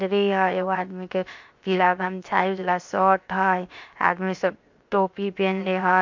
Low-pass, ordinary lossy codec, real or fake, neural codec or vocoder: 7.2 kHz; MP3, 48 kbps; fake; codec, 16 kHz, 0.7 kbps, FocalCodec